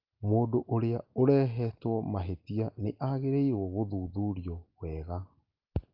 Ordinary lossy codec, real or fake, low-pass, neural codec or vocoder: Opus, 32 kbps; real; 5.4 kHz; none